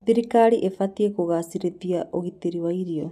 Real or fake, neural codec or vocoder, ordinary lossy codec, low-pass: real; none; none; 14.4 kHz